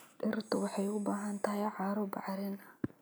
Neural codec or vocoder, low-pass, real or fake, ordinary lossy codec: none; none; real; none